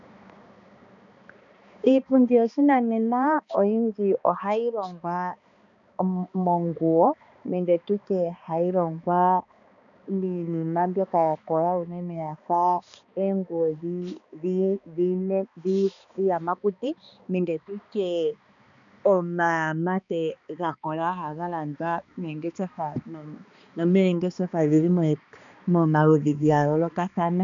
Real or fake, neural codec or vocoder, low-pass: fake; codec, 16 kHz, 2 kbps, X-Codec, HuBERT features, trained on balanced general audio; 7.2 kHz